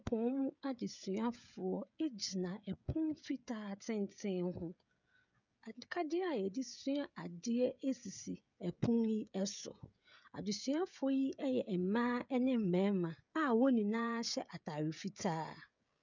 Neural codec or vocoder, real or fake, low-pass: codec, 16 kHz, 16 kbps, FreqCodec, smaller model; fake; 7.2 kHz